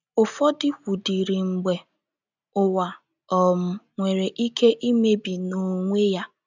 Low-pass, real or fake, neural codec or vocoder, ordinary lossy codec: 7.2 kHz; real; none; none